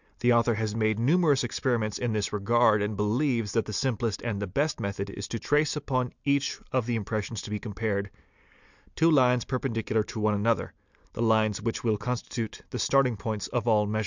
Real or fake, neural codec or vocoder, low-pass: real; none; 7.2 kHz